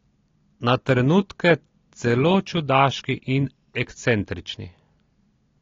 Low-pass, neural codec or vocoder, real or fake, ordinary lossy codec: 7.2 kHz; none; real; AAC, 32 kbps